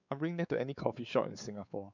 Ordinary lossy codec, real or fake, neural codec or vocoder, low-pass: none; fake; codec, 16 kHz, 4 kbps, X-Codec, WavLM features, trained on Multilingual LibriSpeech; 7.2 kHz